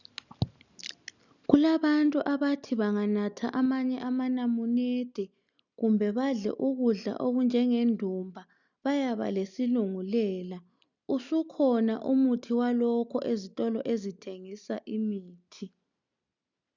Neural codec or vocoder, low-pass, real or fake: none; 7.2 kHz; real